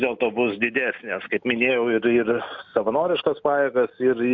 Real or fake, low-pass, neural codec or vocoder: real; 7.2 kHz; none